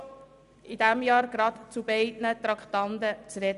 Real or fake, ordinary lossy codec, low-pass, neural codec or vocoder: real; none; 10.8 kHz; none